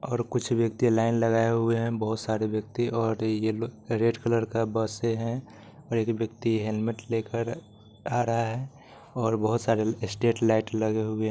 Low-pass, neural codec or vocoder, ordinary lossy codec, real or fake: none; none; none; real